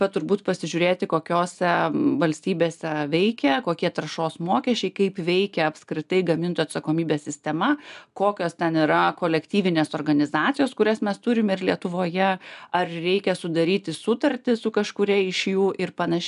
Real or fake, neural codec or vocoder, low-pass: real; none; 10.8 kHz